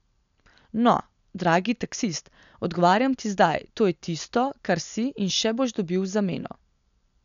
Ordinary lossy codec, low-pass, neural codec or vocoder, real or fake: none; 7.2 kHz; none; real